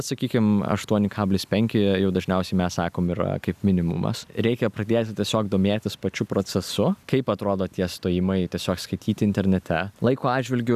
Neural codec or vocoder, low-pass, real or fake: none; 14.4 kHz; real